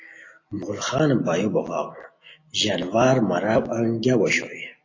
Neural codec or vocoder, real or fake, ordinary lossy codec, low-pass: none; real; AAC, 32 kbps; 7.2 kHz